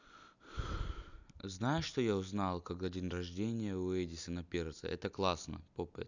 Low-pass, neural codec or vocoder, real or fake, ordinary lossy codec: 7.2 kHz; none; real; none